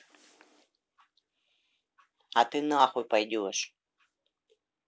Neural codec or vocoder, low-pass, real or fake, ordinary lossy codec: none; none; real; none